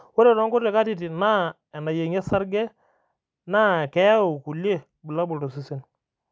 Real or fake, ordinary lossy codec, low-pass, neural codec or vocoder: real; none; none; none